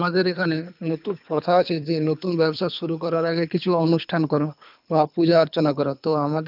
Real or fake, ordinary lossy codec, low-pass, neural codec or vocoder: fake; none; 5.4 kHz; codec, 24 kHz, 3 kbps, HILCodec